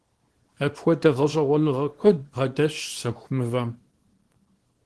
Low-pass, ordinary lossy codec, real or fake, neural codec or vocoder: 10.8 kHz; Opus, 16 kbps; fake; codec, 24 kHz, 0.9 kbps, WavTokenizer, small release